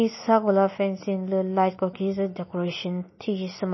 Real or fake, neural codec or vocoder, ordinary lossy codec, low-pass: real; none; MP3, 24 kbps; 7.2 kHz